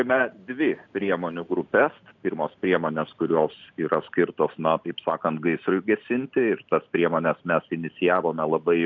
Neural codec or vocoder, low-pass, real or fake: none; 7.2 kHz; real